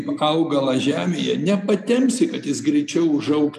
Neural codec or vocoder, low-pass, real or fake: none; 14.4 kHz; real